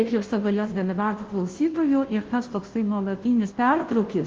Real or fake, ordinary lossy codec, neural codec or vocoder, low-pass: fake; Opus, 16 kbps; codec, 16 kHz, 0.5 kbps, FunCodec, trained on Chinese and English, 25 frames a second; 7.2 kHz